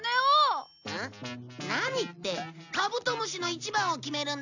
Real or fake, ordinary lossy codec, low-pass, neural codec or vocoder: real; none; 7.2 kHz; none